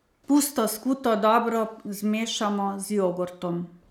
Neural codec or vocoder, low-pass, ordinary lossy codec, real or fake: none; 19.8 kHz; none; real